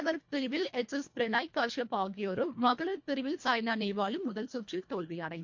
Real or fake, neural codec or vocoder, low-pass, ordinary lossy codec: fake; codec, 24 kHz, 1.5 kbps, HILCodec; 7.2 kHz; MP3, 48 kbps